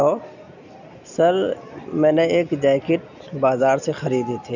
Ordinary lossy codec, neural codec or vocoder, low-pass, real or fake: none; none; 7.2 kHz; real